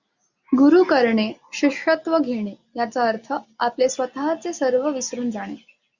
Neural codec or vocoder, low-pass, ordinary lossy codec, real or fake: none; 7.2 kHz; Opus, 64 kbps; real